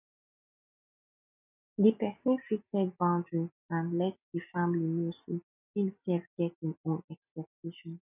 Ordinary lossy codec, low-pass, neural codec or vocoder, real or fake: none; 3.6 kHz; none; real